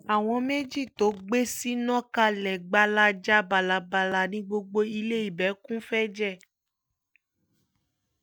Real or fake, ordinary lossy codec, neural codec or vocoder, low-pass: real; none; none; none